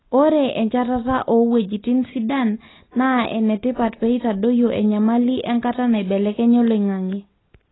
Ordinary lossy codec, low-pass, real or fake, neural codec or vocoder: AAC, 16 kbps; 7.2 kHz; real; none